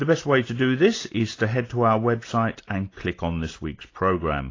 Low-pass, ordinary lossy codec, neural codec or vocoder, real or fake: 7.2 kHz; AAC, 32 kbps; none; real